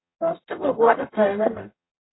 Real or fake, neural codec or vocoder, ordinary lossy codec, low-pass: fake; codec, 44.1 kHz, 0.9 kbps, DAC; AAC, 16 kbps; 7.2 kHz